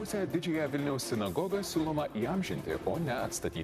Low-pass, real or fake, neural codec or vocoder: 14.4 kHz; fake; vocoder, 44.1 kHz, 128 mel bands, Pupu-Vocoder